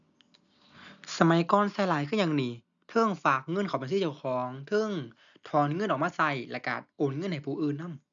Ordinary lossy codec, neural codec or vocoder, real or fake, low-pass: none; none; real; 7.2 kHz